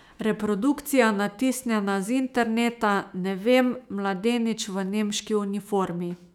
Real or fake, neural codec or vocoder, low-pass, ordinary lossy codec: fake; autoencoder, 48 kHz, 128 numbers a frame, DAC-VAE, trained on Japanese speech; 19.8 kHz; none